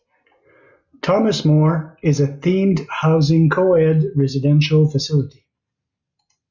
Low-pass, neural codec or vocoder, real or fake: 7.2 kHz; none; real